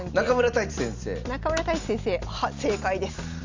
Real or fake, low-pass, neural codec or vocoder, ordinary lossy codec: real; 7.2 kHz; none; Opus, 64 kbps